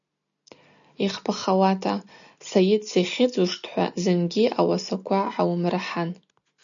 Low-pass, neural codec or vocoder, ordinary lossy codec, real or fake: 7.2 kHz; none; MP3, 96 kbps; real